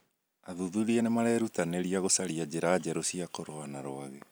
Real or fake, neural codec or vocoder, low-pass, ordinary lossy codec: real; none; none; none